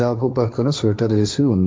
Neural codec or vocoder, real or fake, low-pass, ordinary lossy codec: codec, 16 kHz, 1.1 kbps, Voila-Tokenizer; fake; none; none